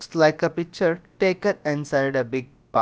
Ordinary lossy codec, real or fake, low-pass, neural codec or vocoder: none; fake; none; codec, 16 kHz, about 1 kbps, DyCAST, with the encoder's durations